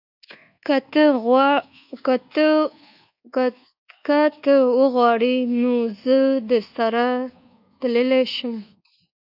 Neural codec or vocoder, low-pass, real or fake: codec, 24 kHz, 1.2 kbps, DualCodec; 5.4 kHz; fake